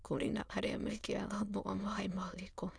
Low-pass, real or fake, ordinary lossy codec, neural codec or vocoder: none; fake; none; autoencoder, 22.05 kHz, a latent of 192 numbers a frame, VITS, trained on many speakers